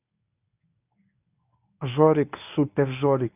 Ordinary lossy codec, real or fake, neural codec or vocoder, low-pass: none; fake; codec, 24 kHz, 0.9 kbps, WavTokenizer, medium speech release version 1; 3.6 kHz